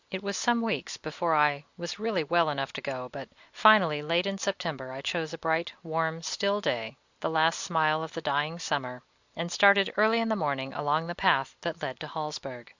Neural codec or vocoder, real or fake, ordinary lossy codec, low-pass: none; real; Opus, 64 kbps; 7.2 kHz